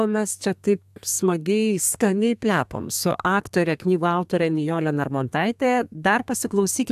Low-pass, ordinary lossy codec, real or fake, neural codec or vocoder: 14.4 kHz; AAC, 96 kbps; fake; codec, 32 kHz, 1.9 kbps, SNAC